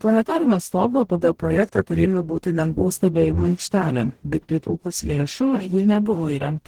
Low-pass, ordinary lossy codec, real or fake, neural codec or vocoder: 19.8 kHz; Opus, 16 kbps; fake; codec, 44.1 kHz, 0.9 kbps, DAC